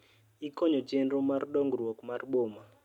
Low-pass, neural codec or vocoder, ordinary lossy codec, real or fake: 19.8 kHz; none; none; real